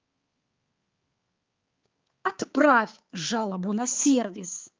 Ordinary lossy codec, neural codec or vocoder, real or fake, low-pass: Opus, 32 kbps; codec, 16 kHz, 4 kbps, X-Codec, HuBERT features, trained on balanced general audio; fake; 7.2 kHz